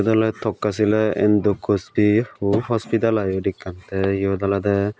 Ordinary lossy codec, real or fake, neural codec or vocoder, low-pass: none; real; none; none